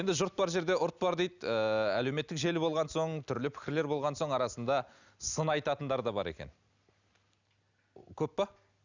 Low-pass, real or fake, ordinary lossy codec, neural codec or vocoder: 7.2 kHz; real; none; none